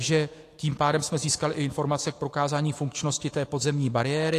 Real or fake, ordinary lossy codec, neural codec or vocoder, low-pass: fake; AAC, 48 kbps; autoencoder, 48 kHz, 128 numbers a frame, DAC-VAE, trained on Japanese speech; 14.4 kHz